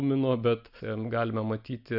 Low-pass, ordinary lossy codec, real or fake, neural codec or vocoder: 5.4 kHz; AAC, 48 kbps; real; none